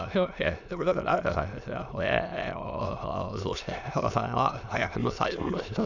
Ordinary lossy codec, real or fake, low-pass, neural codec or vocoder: none; fake; 7.2 kHz; autoencoder, 22.05 kHz, a latent of 192 numbers a frame, VITS, trained on many speakers